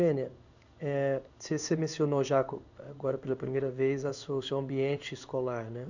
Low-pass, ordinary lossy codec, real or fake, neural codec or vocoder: 7.2 kHz; none; fake; codec, 16 kHz in and 24 kHz out, 1 kbps, XY-Tokenizer